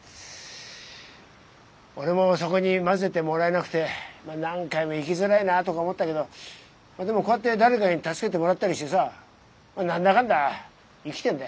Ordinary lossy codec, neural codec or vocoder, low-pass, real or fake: none; none; none; real